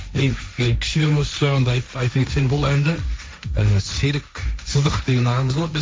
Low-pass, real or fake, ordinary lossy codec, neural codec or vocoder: none; fake; none; codec, 16 kHz, 1.1 kbps, Voila-Tokenizer